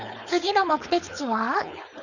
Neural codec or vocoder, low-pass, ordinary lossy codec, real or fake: codec, 16 kHz, 4.8 kbps, FACodec; 7.2 kHz; none; fake